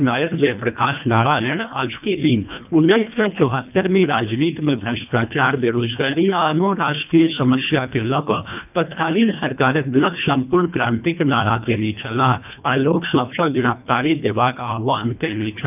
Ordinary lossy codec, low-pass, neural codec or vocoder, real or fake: none; 3.6 kHz; codec, 24 kHz, 1.5 kbps, HILCodec; fake